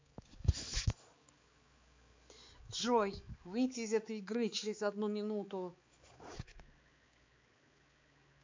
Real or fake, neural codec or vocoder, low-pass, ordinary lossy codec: fake; codec, 16 kHz, 4 kbps, X-Codec, HuBERT features, trained on balanced general audio; 7.2 kHz; MP3, 48 kbps